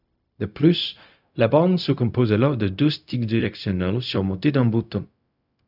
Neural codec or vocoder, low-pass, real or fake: codec, 16 kHz, 0.4 kbps, LongCat-Audio-Codec; 5.4 kHz; fake